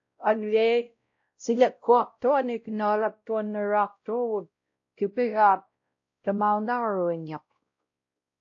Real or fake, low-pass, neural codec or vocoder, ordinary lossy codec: fake; 7.2 kHz; codec, 16 kHz, 0.5 kbps, X-Codec, WavLM features, trained on Multilingual LibriSpeech; AAC, 48 kbps